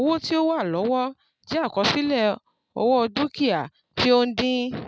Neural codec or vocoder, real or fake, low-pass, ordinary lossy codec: none; real; none; none